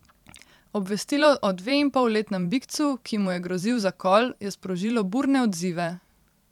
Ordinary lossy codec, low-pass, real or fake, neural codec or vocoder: none; 19.8 kHz; fake; vocoder, 44.1 kHz, 128 mel bands every 512 samples, BigVGAN v2